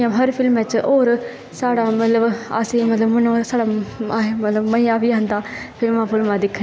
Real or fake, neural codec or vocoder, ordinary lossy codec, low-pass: real; none; none; none